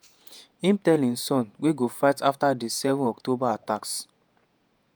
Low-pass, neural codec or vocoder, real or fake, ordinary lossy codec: none; vocoder, 48 kHz, 128 mel bands, Vocos; fake; none